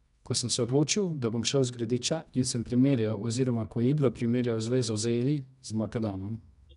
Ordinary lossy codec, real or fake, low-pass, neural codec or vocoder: none; fake; 10.8 kHz; codec, 24 kHz, 0.9 kbps, WavTokenizer, medium music audio release